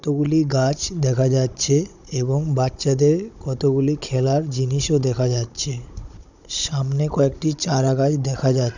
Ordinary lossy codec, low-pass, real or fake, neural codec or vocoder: none; 7.2 kHz; fake; codec, 16 kHz, 16 kbps, FunCodec, trained on Chinese and English, 50 frames a second